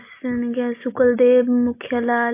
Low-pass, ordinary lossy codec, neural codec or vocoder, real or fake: 3.6 kHz; AAC, 24 kbps; none; real